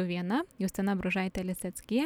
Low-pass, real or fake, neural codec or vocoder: 19.8 kHz; real; none